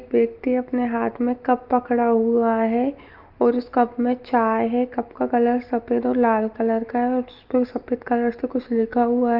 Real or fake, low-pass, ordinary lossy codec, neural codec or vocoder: real; 5.4 kHz; Opus, 32 kbps; none